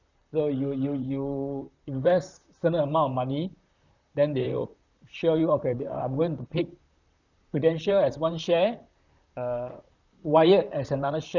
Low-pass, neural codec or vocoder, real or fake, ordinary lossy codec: 7.2 kHz; vocoder, 44.1 kHz, 128 mel bands, Pupu-Vocoder; fake; none